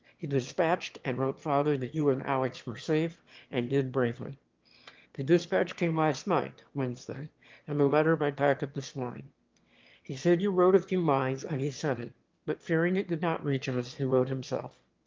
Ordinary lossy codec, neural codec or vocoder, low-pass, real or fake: Opus, 32 kbps; autoencoder, 22.05 kHz, a latent of 192 numbers a frame, VITS, trained on one speaker; 7.2 kHz; fake